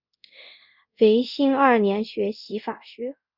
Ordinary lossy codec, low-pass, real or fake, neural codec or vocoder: Opus, 64 kbps; 5.4 kHz; fake; codec, 24 kHz, 0.5 kbps, DualCodec